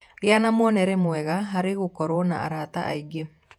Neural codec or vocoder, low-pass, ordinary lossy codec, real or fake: vocoder, 48 kHz, 128 mel bands, Vocos; 19.8 kHz; none; fake